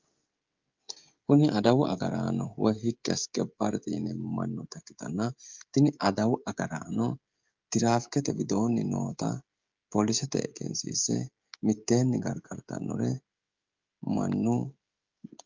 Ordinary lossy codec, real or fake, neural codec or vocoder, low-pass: Opus, 24 kbps; fake; codec, 16 kHz, 16 kbps, FreqCodec, smaller model; 7.2 kHz